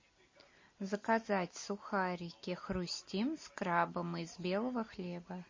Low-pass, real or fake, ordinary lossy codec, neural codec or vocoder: 7.2 kHz; real; MP3, 32 kbps; none